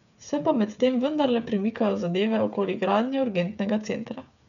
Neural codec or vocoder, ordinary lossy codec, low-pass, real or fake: codec, 16 kHz, 8 kbps, FreqCodec, smaller model; none; 7.2 kHz; fake